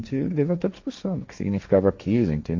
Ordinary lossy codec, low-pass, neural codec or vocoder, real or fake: MP3, 48 kbps; 7.2 kHz; codec, 16 kHz, 1.1 kbps, Voila-Tokenizer; fake